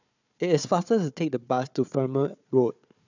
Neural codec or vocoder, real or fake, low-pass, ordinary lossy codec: codec, 16 kHz, 4 kbps, FunCodec, trained on Chinese and English, 50 frames a second; fake; 7.2 kHz; none